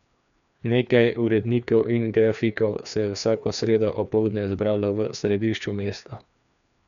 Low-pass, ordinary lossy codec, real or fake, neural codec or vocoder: 7.2 kHz; none; fake; codec, 16 kHz, 2 kbps, FreqCodec, larger model